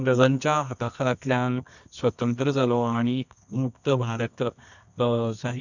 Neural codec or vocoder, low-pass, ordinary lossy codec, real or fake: codec, 24 kHz, 0.9 kbps, WavTokenizer, medium music audio release; 7.2 kHz; none; fake